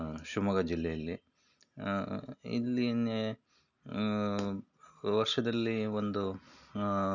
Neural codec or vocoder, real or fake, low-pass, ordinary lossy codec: none; real; 7.2 kHz; none